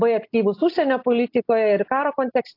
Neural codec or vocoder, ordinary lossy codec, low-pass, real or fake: none; AAC, 32 kbps; 5.4 kHz; real